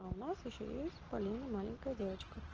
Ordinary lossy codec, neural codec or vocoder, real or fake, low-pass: Opus, 16 kbps; none; real; 7.2 kHz